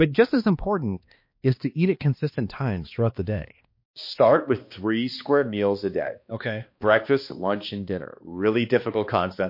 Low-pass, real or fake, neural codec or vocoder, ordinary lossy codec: 5.4 kHz; fake; codec, 16 kHz, 2 kbps, X-Codec, HuBERT features, trained on balanced general audio; MP3, 32 kbps